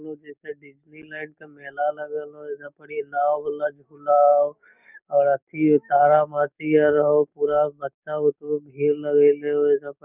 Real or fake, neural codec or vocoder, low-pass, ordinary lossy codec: fake; codec, 44.1 kHz, 7.8 kbps, DAC; 3.6 kHz; none